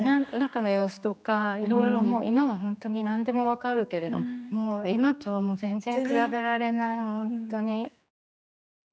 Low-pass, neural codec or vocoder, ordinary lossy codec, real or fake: none; codec, 16 kHz, 2 kbps, X-Codec, HuBERT features, trained on general audio; none; fake